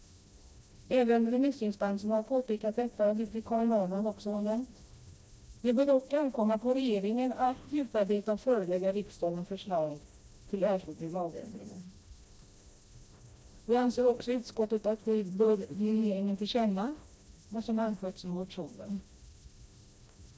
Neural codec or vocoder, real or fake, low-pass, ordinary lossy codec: codec, 16 kHz, 1 kbps, FreqCodec, smaller model; fake; none; none